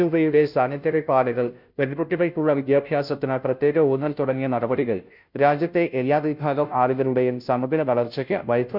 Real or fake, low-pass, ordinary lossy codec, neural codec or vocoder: fake; 5.4 kHz; none; codec, 16 kHz, 0.5 kbps, FunCodec, trained on Chinese and English, 25 frames a second